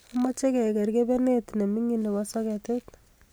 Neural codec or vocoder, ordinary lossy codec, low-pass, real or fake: none; none; none; real